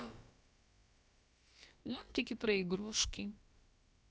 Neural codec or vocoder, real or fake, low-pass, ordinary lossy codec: codec, 16 kHz, about 1 kbps, DyCAST, with the encoder's durations; fake; none; none